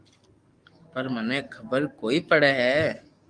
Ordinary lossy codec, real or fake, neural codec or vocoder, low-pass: Opus, 32 kbps; fake; codec, 44.1 kHz, 7.8 kbps, DAC; 9.9 kHz